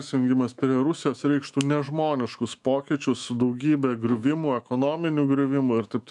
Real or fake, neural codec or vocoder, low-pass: fake; autoencoder, 48 kHz, 128 numbers a frame, DAC-VAE, trained on Japanese speech; 10.8 kHz